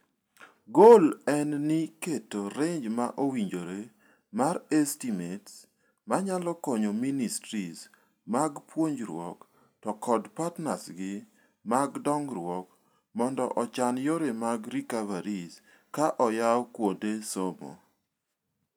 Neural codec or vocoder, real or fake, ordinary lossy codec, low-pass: none; real; none; none